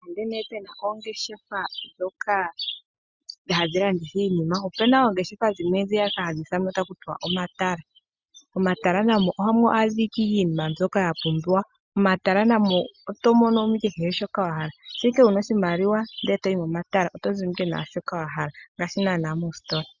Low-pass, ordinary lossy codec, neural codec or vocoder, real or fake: 7.2 kHz; Opus, 64 kbps; none; real